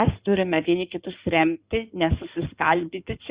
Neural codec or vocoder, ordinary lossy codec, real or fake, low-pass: codec, 16 kHz in and 24 kHz out, 2.2 kbps, FireRedTTS-2 codec; Opus, 64 kbps; fake; 3.6 kHz